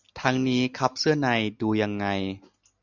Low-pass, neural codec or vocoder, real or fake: 7.2 kHz; none; real